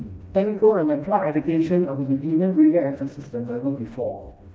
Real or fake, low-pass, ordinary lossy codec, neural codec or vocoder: fake; none; none; codec, 16 kHz, 1 kbps, FreqCodec, smaller model